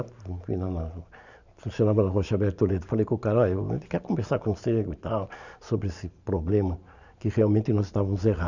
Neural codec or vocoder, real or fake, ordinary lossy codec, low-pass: vocoder, 44.1 kHz, 128 mel bands every 512 samples, BigVGAN v2; fake; none; 7.2 kHz